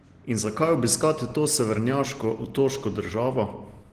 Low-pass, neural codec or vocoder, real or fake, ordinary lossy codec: 14.4 kHz; none; real; Opus, 16 kbps